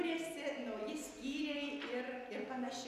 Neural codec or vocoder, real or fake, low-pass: none; real; 14.4 kHz